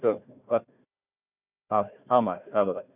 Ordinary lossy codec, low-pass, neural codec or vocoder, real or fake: none; 3.6 kHz; codec, 16 kHz, 1 kbps, FunCodec, trained on Chinese and English, 50 frames a second; fake